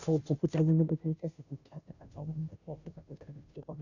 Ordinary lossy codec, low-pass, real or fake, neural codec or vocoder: none; 7.2 kHz; fake; codec, 16 kHz, 0.5 kbps, FunCodec, trained on Chinese and English, 25 frames a second